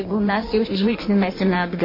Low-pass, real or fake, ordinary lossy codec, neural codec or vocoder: 5.4 kHz; fake; MP3, 24 kbps; codec, 16 kHz in and 24 kHz out, 1.1 kbps, FireRedTTS-2 codec